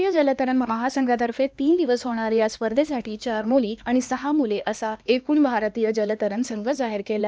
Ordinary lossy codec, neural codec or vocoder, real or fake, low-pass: none; codec, 16 kHz, 2 kbps, X-Codec, HuBERT features, trained on LibriSpeech; fake; none